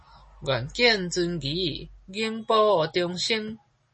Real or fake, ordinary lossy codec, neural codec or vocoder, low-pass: real; MP3, 32 kbps; none; 10.8 kHz